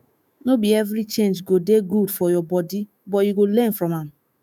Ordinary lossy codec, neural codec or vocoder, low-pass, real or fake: none; autoencoder, 48 kHz, 128 numbers a frame, DAC-VAE, trained on Japanese speech; none; fake